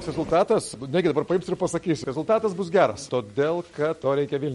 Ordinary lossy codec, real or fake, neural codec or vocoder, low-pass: MP3, 48 kbps; real; none; 19.8 kHz